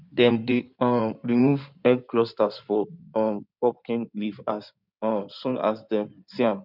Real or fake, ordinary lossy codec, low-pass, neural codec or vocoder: fake; none; 5.4 kHz; codec, 16 kHz in and 24 kHz out, 2.2 kbps, FireRedTTS-2 codec